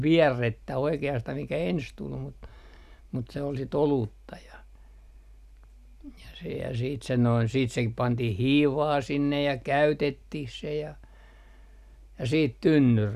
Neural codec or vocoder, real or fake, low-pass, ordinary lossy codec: none; real; 14.4 kHz; none